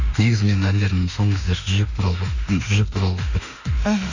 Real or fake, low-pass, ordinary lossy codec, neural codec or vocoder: fake; 7.2 kHz; none; autoencoder, 48 kHz, 32 numbers a frame, DAC-VAE, trained on Japanese speech